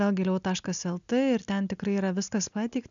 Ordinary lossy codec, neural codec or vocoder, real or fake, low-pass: MP3, 96 kbps; none; real; 7.2 kHz